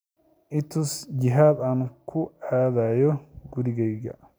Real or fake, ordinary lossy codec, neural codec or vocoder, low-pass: real; none; none; none